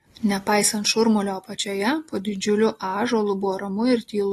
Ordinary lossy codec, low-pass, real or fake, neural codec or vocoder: AAC, 32 kbps; 19.8 kHz; real; none